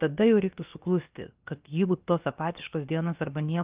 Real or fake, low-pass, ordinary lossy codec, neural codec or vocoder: fake; 3.6 kHz; Opus, 24 kbps; codec, 16 kHz, about 1 kbps, DyCAST, with the encoder's durations